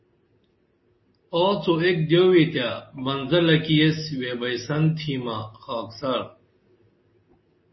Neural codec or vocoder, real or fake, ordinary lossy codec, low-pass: none; real; MP3, 24 kbps; 7.2 kHz